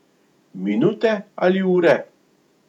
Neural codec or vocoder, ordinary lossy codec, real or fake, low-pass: vocoder, 48 kHz, 128 mel bands, Vocos; none; fake; 19.8 kHz